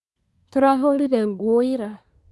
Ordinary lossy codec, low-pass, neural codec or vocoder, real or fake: none; none; codec, 24 kHz, 1 kbps, SNAC; fake